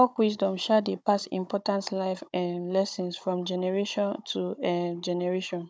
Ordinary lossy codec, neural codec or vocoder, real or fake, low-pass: none; codec, 16 kHz, 16 kbps, FunCodec, trained on Chinese and English, 50 frames a second; fake; none